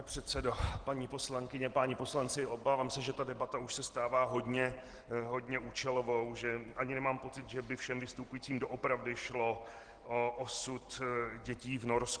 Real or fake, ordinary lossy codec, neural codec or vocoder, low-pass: real; Opus, 16 kbps; none; 9.9 kHz